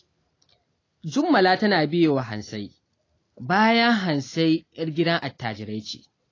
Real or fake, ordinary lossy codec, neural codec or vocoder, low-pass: real; AAC, 32 kbps; none; 7.2 kHz